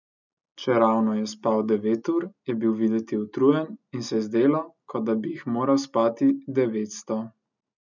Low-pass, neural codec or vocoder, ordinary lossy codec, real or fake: 7.2 kHz; none; none; real